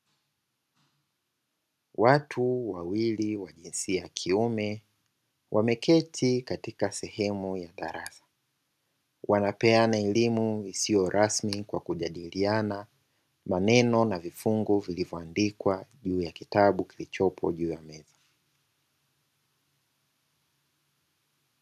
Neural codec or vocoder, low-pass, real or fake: none; 14.4 kHz; real